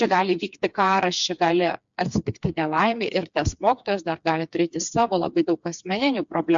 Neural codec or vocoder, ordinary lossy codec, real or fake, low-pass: codec, 16 kHz, 4 kbps, FreqCodec, smaller model; MP3, 64 kbps; fake; 7.2 kHz